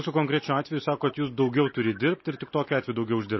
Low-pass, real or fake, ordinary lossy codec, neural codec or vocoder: 7.2 kHz; real; MP3, 24 kbps; none